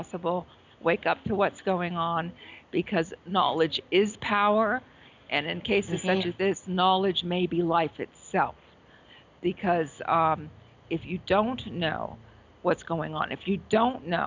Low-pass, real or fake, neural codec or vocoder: 7.2 kHz; real; none